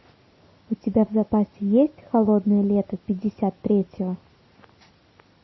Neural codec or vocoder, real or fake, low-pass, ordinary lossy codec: none; real; 7.2 kHz; MP3, 24 kbps